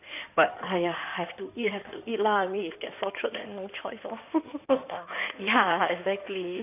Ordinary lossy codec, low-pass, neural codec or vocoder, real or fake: none; 3.6 kHz; codec, 16 kHz in and 24 kHz out, 2.2 kbps, FireRedTTS-2 codec; fake